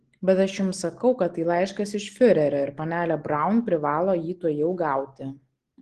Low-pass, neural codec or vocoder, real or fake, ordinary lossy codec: 10.8 kHz; none; real; Opus, 16 kbps